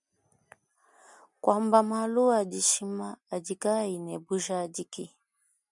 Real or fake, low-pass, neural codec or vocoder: real; 10.8 kHz; none